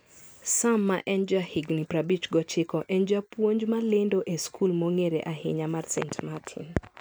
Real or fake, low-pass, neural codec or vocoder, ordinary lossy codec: real; none; none; none